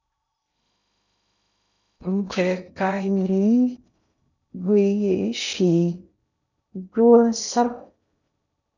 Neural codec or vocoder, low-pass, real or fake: codec, 16 kHz in and 24 kHz out, 0.6 kbps, FocalCodec, streaming, 2048 codes; 7.2 kHz; fake